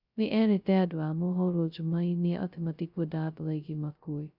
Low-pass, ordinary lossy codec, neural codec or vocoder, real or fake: 5.4 kHz; none; codec, 16 kHz, 0.2 kbps, FocalCodec; fake